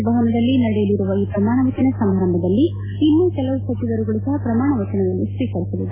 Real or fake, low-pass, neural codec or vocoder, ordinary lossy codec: real; 3.6 kHz; none; AAC, 16 kbps